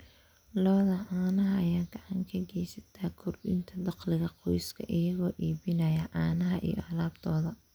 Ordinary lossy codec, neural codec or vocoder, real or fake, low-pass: none; none; real; none